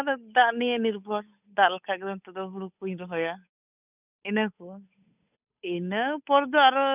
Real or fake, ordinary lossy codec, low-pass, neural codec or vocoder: fake; none; 3.6 kHz; codec, 16 kHz, 8 kbps, FunCodec, trained on Chinese and English, 25 frames a second